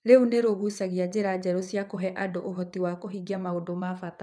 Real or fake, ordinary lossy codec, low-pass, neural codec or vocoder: fake; none; none; vocoder, 22.05 kHz, 80 mel bands, WaveNeXt